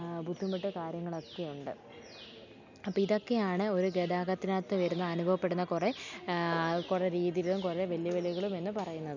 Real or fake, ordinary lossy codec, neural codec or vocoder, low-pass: real; none; none; 7.2 kHz